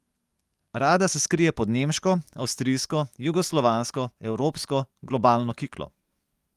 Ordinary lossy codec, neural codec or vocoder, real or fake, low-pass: Opus, 32 kbps; codec, 44.1 kHz, 7.8 kbps, DAC; fake; 14.4 kHz